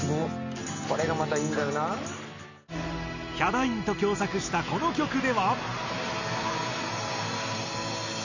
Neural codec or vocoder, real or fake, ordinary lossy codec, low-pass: none; real; none; 7.2 kHz